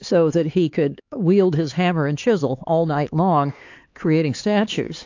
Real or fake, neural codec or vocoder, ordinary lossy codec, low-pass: fake; codec, 16 kHz, 4 kbps, X-Codec, HuBERT features, trained on LibriSpeech; AAC, 48 kbps; 7.2 kHz